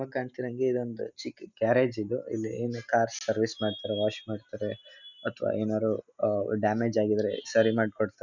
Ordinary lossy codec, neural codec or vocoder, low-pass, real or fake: none; none; 7.2 kHz; real